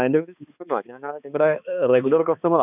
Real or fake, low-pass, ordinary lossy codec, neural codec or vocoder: fake; 3.6 kHz; none; codec, 16 kHz, 2 kbps, X-Codec, HuBERT features, trained on balanced general audio